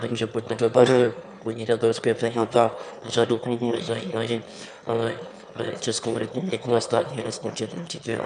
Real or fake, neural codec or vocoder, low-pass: fake; autoencoder, 22.05 kHz, a latent of 192 numbers a frame, VITS, trained on one speaker; 9.9 kHz